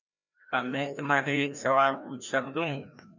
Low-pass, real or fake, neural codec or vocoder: 7.2 kHz; fake; codec, 16 kHz, 1 kbps, FreqCodec, larger model